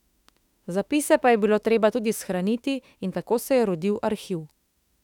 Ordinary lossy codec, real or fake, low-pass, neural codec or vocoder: none; fake; 19.8 kHz; autoencoder, 48 kHz, 32 numbers a frame, DAC-VAE, trained on Japanese speech